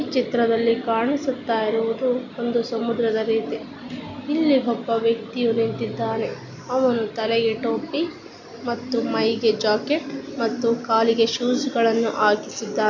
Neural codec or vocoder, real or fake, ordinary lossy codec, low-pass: none; real; none; 7.2 kHz